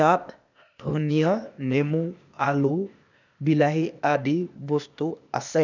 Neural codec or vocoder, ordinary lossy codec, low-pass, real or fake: codec, 16 kHz, 0.8 kbps, ZipCodec; none; 7.2 kHz; fake